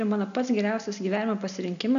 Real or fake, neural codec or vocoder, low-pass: real; none; 7.2 kHz